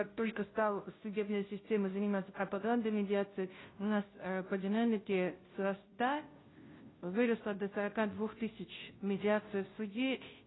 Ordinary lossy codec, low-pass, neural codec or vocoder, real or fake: AAC, 16 kbps; 7.2 kHz; codec, 16 kHz, 0.5 kbps, FunCodec, trained on Chinese and English, 25 frames a second; fake